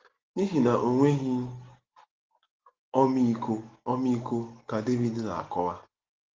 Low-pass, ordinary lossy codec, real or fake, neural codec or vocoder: 7.2 kHz; Opus, 16 kbps; real; none